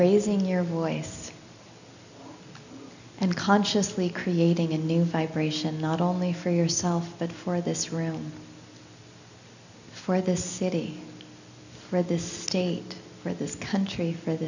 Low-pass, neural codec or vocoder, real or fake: 7.2 kHz; none; real